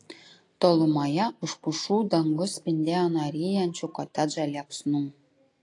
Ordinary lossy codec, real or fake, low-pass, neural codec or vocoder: AAC, 48 kbps; real; 10.8 kHz; none